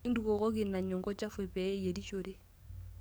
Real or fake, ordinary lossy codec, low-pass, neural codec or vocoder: fake; none; none; codec, 44.1 kHz, 7.8 kbps, DAC